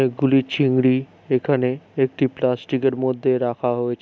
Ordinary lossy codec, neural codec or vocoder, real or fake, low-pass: none; none; real; none